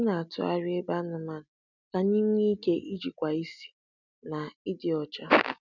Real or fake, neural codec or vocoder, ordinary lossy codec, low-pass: real; none; none; 7.2 kHz